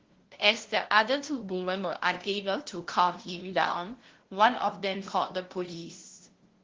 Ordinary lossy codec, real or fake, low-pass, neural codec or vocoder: Opus, 16 kbps; fake; 7.2 kHz; codec, 16 kHz, 0.5 kbps, FunCodec, trained on LibriTTS, 25 frames a second